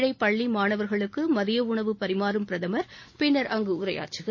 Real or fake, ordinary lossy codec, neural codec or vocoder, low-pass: real; MP3, 32 kbps; none; 7.2 kHz